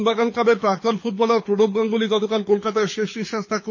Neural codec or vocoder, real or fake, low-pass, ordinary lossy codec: codec, 24 kHz, 6 kbps, HILCodec; fake; 7.2 kHz; MP3, 32 kbps